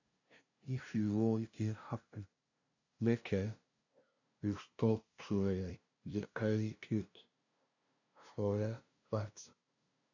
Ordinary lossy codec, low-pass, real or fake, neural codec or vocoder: none; 7.2 kHz; fake; codec, 16 kHz, 0.5 kbps, FunCodec, trained on LibriTTS, 25 frames a second